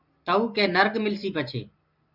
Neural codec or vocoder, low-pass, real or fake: none; 5.4 kHz; real